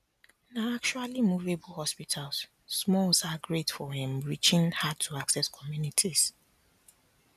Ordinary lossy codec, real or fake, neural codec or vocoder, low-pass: none; real; none; 14.4 kHz